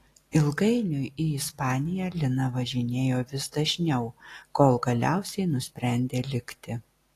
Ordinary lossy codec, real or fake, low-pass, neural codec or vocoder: AAC, 48 kbps; real; 14.4 kHz; none